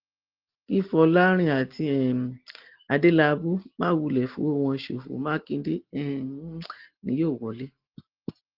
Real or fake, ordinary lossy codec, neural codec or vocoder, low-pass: real; Opus, 16 kbps; none; 5.4 kHz